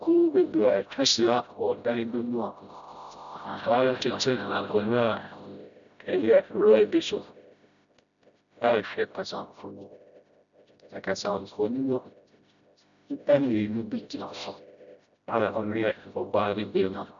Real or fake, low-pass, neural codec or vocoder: fake; 7.2 kHz; codec, 16 kHz, 0.5 kbps, FreqCodec, smaller model